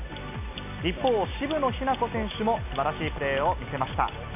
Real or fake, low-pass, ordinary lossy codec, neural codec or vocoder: real; 3.6 kHz; none; none